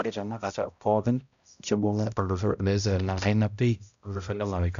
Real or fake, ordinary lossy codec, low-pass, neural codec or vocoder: fake; AAC, 96 kbps; 7.2 kHz; codec, 16 kHz, 0.5 kbps, X-Codec, HuBERT features, trained on balanced general audio